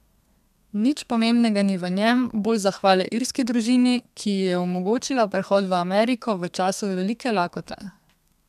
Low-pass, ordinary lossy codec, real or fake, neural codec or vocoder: 14.4 kHz; none; fake; codec, 32 kHz, 1.9 kbps, SNAC